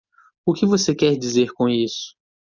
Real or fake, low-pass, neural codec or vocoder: real; 7.2 kHz; none